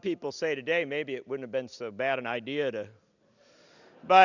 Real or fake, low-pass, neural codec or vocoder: real; 7.2 kHz; none